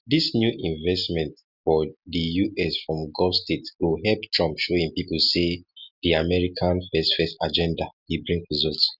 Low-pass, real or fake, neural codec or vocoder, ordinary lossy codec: 5.4 kHz; real; none; none